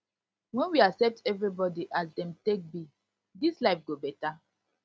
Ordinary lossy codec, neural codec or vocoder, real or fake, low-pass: none; none; real; none